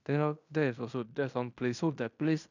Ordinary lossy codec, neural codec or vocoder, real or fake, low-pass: none; codec, 16 kHz in and 24 kHz out, 0.9 kbps, LongCat-Audio-Codec, fine tuned four codebook decoder; fake; 7.2 kHz